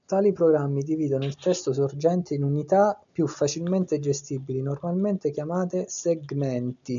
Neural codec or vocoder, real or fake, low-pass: none; real; 7.2 kHz